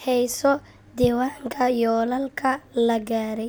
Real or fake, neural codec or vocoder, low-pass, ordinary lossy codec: real; none; none; none